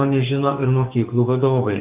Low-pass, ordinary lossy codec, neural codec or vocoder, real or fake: 3.6 kHz; Opus, 32 kbps; codec, 44.1 kHz, 2.6 kbps, SNAC; fake